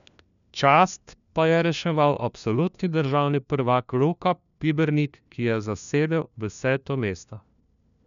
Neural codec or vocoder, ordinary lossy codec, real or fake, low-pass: codec, 16 kHz, 1 kbps, FunCodec, trained on LibriTTS, 50 frames a second; none; fake; 7.2 kHz